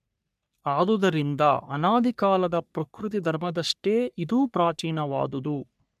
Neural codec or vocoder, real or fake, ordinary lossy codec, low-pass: codec, 44.1 kHz, 3.4 kbps, Pupu-Codec; fake; none; 14.4 kHz